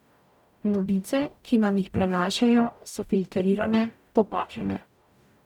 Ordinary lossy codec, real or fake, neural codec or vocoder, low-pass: none; fake; codec, 44.1 kHz, 0.9 kbps, DAC; 19.8 kHz